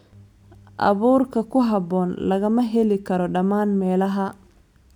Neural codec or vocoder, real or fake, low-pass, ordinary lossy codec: none; real; 19.8 kHz; none